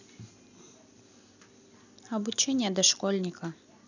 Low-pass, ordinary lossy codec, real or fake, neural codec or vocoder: 7.2 kHz; none; fake; vocoder, 44.1 kHz, 128 mel bands every 256 samples, BigVGAN v2